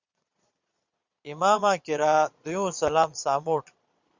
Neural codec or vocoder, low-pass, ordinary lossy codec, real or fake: vocoder, 22.05 kHz, 80 mel bands, Vocos; 7.2 kHz; Opus, 64 kbps; fake